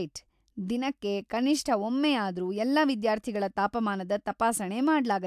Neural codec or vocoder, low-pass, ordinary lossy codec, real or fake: none; 14.4 kHz; AAC, 96 kbps; real